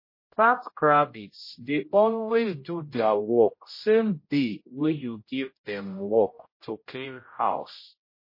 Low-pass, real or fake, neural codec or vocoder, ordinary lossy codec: 5.4 kHz; fake; codec, 16 kHz, 0.5 kbps, X-Codec, HuBERT features, trained on general audio; MP3, 24 kbps